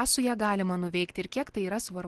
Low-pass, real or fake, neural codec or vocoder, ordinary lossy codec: 10.8 kHz; real; none; Opus, 16 kbps